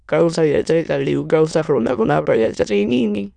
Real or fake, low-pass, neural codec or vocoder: fake; 9.9 kHz; autoencoder, 22.05 kHz, a latent of 192 numbers a frame, VITS, trained on many speakers